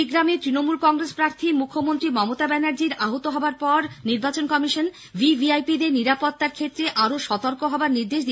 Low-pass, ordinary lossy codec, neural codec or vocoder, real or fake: none; none; none; real